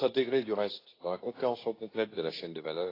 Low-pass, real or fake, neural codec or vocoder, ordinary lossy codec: 5.4 kHz; fake; codec, 24 kHz, 0.9 kbps, WavTokenizer, medium speech release version 2; AAC, 24 kbps